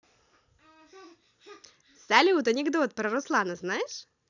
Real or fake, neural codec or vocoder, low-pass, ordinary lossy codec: real; none; 7.2 kHz; none